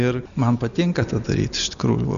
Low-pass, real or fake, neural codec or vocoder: 7.2 kHz; real; none